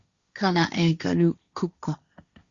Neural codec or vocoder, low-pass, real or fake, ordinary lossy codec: codec, 16 kHz, 1.1 kbps, Voila-Tokenizer; 7.2 kHz; fake; Opus, 64 kbps